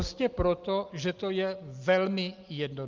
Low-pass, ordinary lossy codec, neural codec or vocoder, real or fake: 7.2 kHz; Opus, 24 kbps; none; real